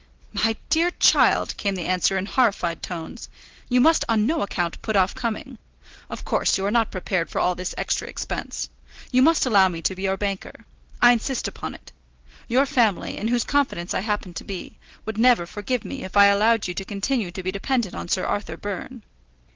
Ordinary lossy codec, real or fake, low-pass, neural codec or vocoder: Opus, 16 kbps; real; 7.2 kHz; none